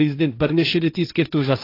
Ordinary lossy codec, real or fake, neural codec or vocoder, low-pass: AAC, 32 kbps; fake; codec, 16 kHz, 1.1 kbps, Voila-Tokenizer; 5.4 kHz